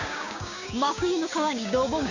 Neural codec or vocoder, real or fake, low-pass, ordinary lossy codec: codec, 16 kHz, 6 kbps, DAC; fake; 7.2 kHz; none